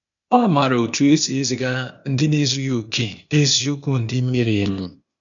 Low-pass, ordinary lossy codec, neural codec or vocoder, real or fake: 7.2 kHz; none; codec, 16 kHz, 0.8 kbps, ZipCodec; fake